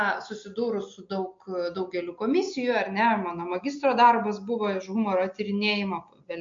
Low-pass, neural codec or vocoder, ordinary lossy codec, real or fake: 7.2 kHz; none; MP3, 48 kbps; real